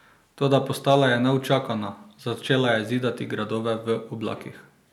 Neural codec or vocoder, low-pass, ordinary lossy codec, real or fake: none; 19.8 kHz; none; real